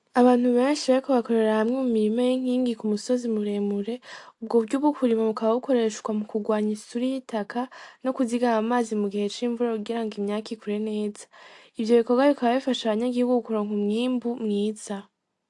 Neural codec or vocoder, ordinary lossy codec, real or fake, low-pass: none; AAC, 64 kbps; real; 10.8 kHz